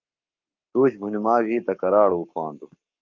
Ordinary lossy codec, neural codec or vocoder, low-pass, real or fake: Opus, 24 kbps; codec, 44.1 kHz, 7.8 kbps, Pupu-Codec; 7.2 kHz; fake